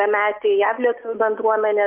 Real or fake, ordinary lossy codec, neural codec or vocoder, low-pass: real; Opus, 24 kbps; none; 3.6 kHz